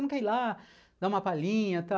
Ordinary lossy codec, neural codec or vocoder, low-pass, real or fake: none; none; none; real